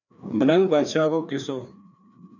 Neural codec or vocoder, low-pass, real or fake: codec, 16 kHz, 2 kbps, FreqCodec, larger model; 7.2 kHz; fake